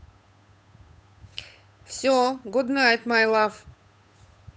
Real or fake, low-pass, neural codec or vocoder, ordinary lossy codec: fake; none; codec, 16 kHz, 8 kbps, FunCodec, trained on Chinese and English, 25 frames a second; none